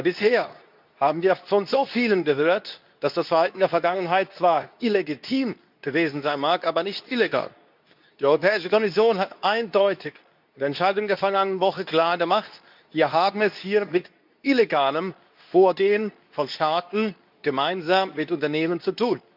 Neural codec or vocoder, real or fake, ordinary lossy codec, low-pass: codec, 24 kHz, 0.9 kbps, WavTokenizer, medium speech release version 2; fake; none; 5.4 kHz